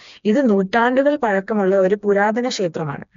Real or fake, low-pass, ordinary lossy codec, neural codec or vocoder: fake; 7.2 kHz; MP3, 64 kbps; codec, 16 kHz, 2 kbps, FreqCodec, smaller model